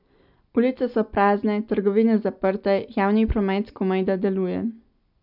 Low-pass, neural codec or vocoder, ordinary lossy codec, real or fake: 5.4 kHz; none; none; real